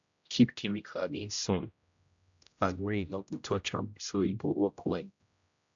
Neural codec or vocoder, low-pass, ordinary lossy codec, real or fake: codec, 16 kHz, 0.5 kbps, X-Codec, HuBERT features, trained on general audio; 7.2 kHz; AAC, 64 kbps; fake